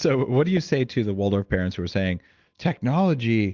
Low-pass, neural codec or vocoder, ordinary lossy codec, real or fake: 7.2 kHz; none; Opus, 32 kbps; real